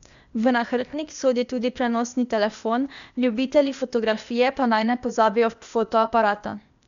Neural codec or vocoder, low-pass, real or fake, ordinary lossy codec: codec, 16 kHz, 0.8 kbps, ZipCodec; 7.2 kHz; fake; none